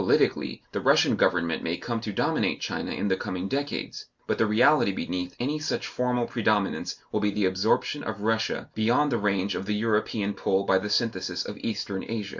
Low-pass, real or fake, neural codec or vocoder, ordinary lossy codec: 7.2 kHz; real; none; Opus, 64 kbps